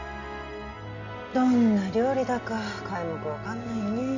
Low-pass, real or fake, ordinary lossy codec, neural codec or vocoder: 7.2 kHz; real; none; none